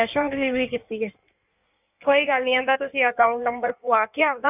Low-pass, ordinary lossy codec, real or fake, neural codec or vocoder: 3.6 kHz; none; fake; codec, 16 kHz in and 24 kHz out, 1.1 kbps, FireRedTTS-2 codec